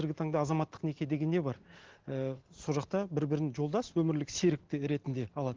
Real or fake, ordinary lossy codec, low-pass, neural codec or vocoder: real; Opus, 16 kbps; 7.2 kHz; none